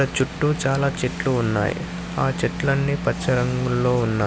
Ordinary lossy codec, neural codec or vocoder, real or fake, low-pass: none; none; real; none